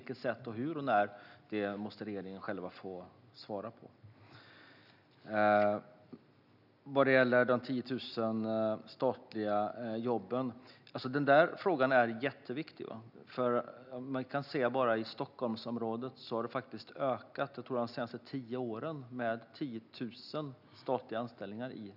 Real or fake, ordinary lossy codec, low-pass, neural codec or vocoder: real; none; 5.4 kHz; none